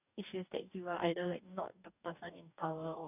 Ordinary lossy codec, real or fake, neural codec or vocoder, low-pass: none; fake; codec, 44.1 kHz, 2.6 kbps, DAC; 3.6 kHz